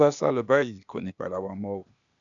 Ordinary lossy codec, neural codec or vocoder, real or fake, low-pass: none; codec, 16 kHz, 0.8 kbps, ZipCodec; fake; 7.2 kHz